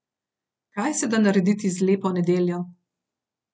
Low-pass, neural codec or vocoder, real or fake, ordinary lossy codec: none; none; real; none